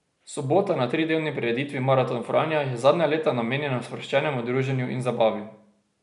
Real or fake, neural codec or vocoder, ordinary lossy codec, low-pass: real; none; none; 10.8 kHz